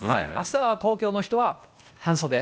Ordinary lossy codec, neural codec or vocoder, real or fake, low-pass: none; codec, 16 kHz, 0.8 kbps, ZipCodec; fake; none